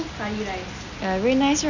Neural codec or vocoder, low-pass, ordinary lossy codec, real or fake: none; 7.2 kHz; none; real